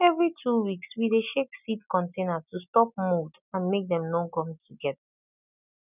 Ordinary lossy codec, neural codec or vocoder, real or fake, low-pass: none; none; real; 3.6 kHz